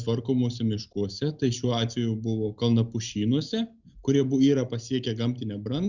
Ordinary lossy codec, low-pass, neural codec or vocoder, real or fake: Opus, 64 kbps; 7.2 kHz; none; real